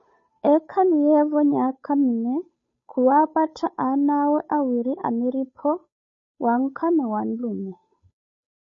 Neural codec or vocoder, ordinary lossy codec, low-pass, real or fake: codec, 16 kHz, 8 kbps, FunCodec, trained on Chinese and English, 25 frames a second; MP3, 32 kbps; 7.2 kHz; fake